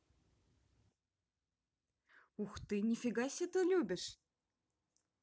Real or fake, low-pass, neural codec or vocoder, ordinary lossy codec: real; none; none; none